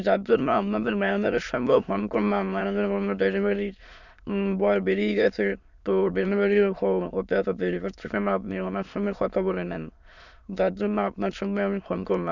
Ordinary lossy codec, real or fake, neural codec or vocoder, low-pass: none; fake; autoencoder, 22.05 kHz, a latent of 192 numbers a frame, VITS, trained on many speakers; 7.2 kHz